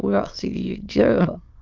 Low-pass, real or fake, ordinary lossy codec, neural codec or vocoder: 7.2 kHz; fake; Opus, 24 kbps; autoencoder, 22.05 kHz, a latent of 192 numbers a frame, VITS, trained on many speakers